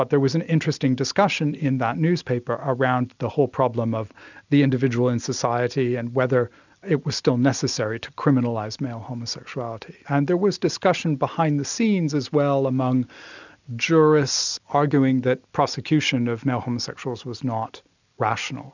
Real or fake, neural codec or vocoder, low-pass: real; none; 7.2 kHz